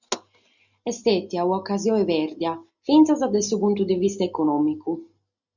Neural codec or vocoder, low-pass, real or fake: none; 7.2 kHz; real